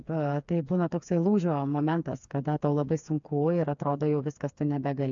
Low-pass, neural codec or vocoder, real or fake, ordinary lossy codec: 7.2 kHz; codec, 16 kHz, 4 kbps, FreqCodec, smaller model; fake; MP3, 64 kbps